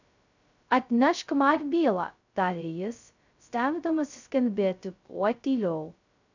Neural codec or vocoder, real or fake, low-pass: codec, 16 kHz, 0.2 kbps, FocalCodec; fake; 7.2 kHz